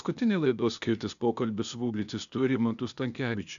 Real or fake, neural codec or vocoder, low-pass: fake; codec, 16 kHz, 0.8 kbps, ZipCodec; 7.2 kHz